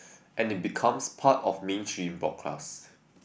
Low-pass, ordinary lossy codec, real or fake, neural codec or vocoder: none; none; real; none